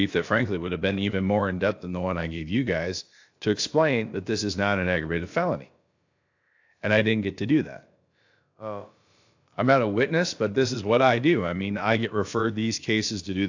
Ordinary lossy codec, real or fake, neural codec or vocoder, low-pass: AAC, 48 kbps; fake; codec, 16 kHz, about 1 kbps, DyCAST, with the encoder's durations; 7.2 kHz